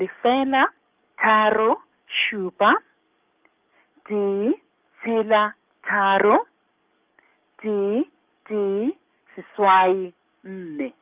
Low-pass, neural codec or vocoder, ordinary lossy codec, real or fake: 3.6 kHz; codec, 44.1 kHz, 7.8 kbps, DAC; Opus, 24 kbps; fake